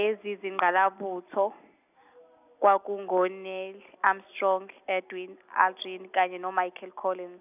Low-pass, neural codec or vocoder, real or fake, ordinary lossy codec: 3.6 kHz; none; real; none